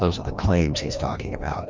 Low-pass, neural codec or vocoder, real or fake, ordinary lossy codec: 7.2 kHz; codec, 16 kHz, 1 kbps, FreqCodec, larger model; fake; Opus, 32 kbps